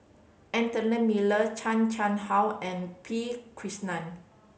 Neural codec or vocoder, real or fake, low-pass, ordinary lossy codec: none; real; none; none